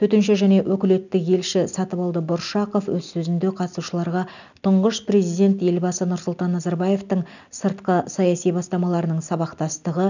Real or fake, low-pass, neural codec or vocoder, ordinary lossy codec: real; 7.2 kHz; none; none